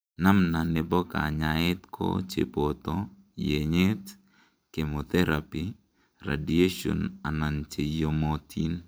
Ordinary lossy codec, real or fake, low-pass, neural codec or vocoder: none; fake; none; vocoder, 44.1 kHz, 128 mel bands every 512 samples, BigVGAN v2